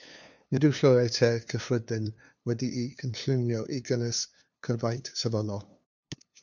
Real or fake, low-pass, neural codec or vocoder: fake; 7.2 kHz; codec, 16 kHz, 2 kbps, FunCodec, trained on LibriTTS, 25 frames a second